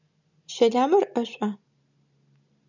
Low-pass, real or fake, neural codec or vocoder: 7.2 kHz; real; none